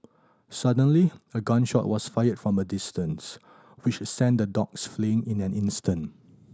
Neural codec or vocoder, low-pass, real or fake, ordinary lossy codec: none; none; real; none